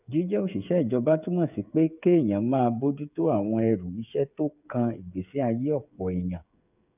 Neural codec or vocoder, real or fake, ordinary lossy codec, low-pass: codec, 16 kHz, 8 kbps, FreqCodec, smaller model; fake; none; 3.6 kHz